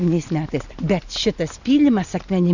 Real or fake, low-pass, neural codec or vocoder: real; 7.2 kHz; none